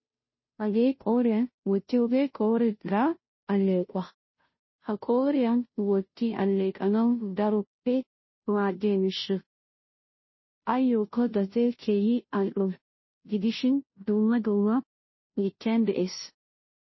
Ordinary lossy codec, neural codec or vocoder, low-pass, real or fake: MP3, 24 kbps; codec, 16 kHz, 0.5 kbps, FunCodec, trained on Chinese and English, 25 frames a second; 7.2 kHz; fake